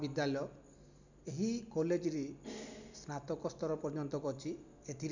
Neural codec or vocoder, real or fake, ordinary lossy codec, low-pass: none; real; none; 7.2 kHz